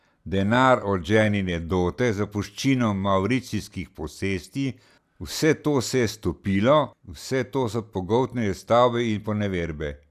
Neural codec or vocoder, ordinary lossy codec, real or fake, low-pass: none; none; real; 14.4 kHz